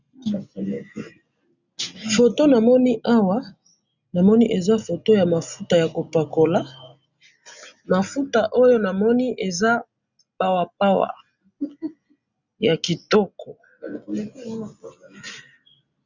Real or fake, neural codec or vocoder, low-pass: real; none; 7.2 kHz